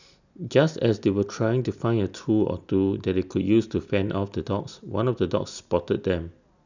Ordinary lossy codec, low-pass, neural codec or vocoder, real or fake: none; 7.2 kHz; none; real